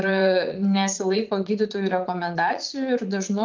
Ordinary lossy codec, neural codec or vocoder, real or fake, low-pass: Opus, 32 kbps; vocoder, 44.1 kHz, 80 mel bands, Vocos; fake; 7.2 kHz